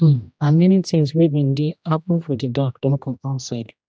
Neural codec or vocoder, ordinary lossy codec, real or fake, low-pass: codec, 16 kHz, 1 kbps, X-Codec, HuBERT features, trained on general audio; none; fake; none